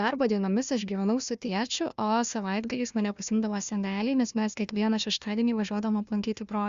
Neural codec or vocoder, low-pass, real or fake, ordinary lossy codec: codec, 16 kHz, 1 kbps, FunCodec, trained on Chinese and English, 50 frames a second; 7.2 kHz; fake; Opus, 64 kbps